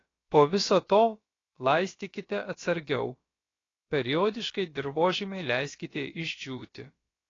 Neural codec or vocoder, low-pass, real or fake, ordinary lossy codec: codec, 16 kHz, about 1 kbps, DyCAST, with the encoder's durations; 7.2 kHz; fake; AAC, 32 kbps